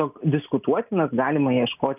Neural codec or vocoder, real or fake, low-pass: none; real; 3.6 kHz